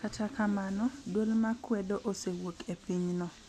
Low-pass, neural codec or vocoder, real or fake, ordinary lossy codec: 14.4 kHz; none; real; none